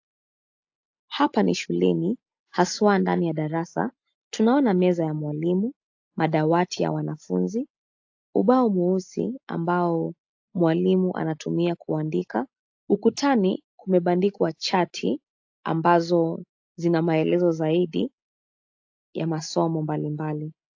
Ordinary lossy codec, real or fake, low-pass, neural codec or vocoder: AAC, 48 kbps; real; 7.2 kHz; none